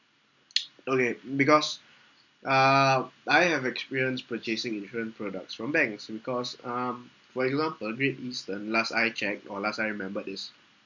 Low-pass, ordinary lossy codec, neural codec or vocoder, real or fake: 7.2 kHz; none; none; real